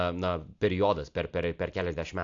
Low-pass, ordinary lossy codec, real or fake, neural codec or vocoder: 7.2 kHz; AAC, 64 kbps; real; none